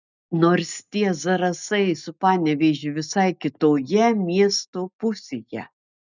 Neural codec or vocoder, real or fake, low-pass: none; real; 7.2 kHz